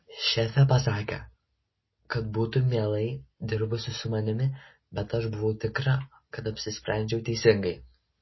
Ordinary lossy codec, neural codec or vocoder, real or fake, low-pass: MP3, 24 kbps; none; real; 7.2 kHz